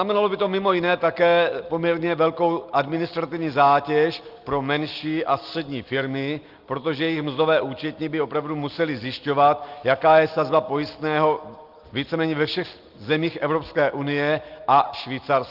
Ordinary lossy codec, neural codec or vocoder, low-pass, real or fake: Opus, 32 kbps; none; 5.4 kHz; real